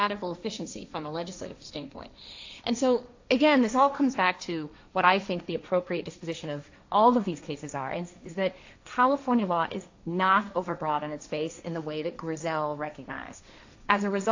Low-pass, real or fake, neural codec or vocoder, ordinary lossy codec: 7.2 kHz; fake; codec, 16 kHz, 1.1 kbps, Voila-Tokenizer; AAC, 48 kbps